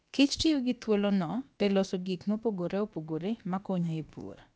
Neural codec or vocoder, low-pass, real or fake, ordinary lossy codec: codec, 16 kHz, about 1 kbps, DyCAST, with the encoder's durations; none; fake; none